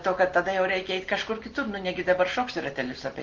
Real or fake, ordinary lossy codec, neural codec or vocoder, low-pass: real; Opus, 16 kbps; none; 7.2 kHz